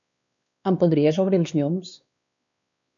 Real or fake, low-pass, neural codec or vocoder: fake; 7.2 kHz; codec, 16 kHz, 2 kbps, X-Codec, WavLM features, trained on Multilingual LibriSpeech